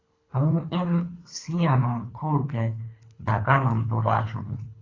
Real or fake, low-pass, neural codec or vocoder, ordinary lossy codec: fake; 7.2 kHz; codec, 24 kHz, 3 kbps, HILCodec; AAC, 32 kbps